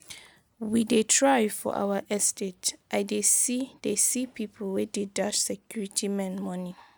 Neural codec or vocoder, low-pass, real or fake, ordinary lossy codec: none; none; real; none